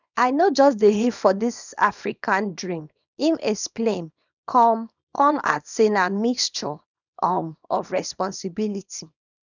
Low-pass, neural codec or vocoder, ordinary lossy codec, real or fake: 7.2 kHz; codec, 24 kHz, 0.9 kbps, WavTokenizer, small release; none; fake